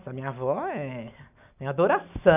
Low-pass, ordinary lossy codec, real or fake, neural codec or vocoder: 3.6 kHz; none; real; none